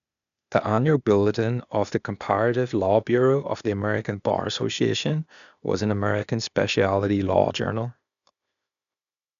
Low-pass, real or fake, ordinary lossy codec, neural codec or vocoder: 7.2 kHz; fake; none; codec, 16 kHz, 0.8 kbps, ZipCodec